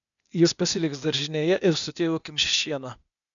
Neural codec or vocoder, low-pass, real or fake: codec, 16 kHz, 0.8 kbps, ZipCodec; 7.2 kHz; fake